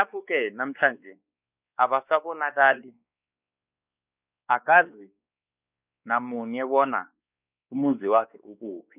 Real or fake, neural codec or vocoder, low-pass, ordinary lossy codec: fake; codec, 16 kHz, 2 kbps, X-Codec, WavLM features, trained on Multilingual LibriSpeech; 3.6 kHz; none